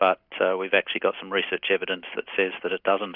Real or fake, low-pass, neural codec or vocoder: real; 5.4 kHz; none